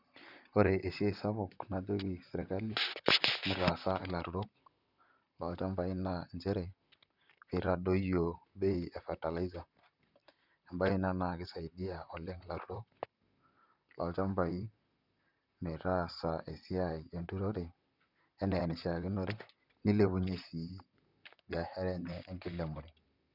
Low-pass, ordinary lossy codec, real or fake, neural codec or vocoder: 5.4 kHz; none; fake; vocoder, 44.1 kHz, 128 mel bands, Pupu-Vocoder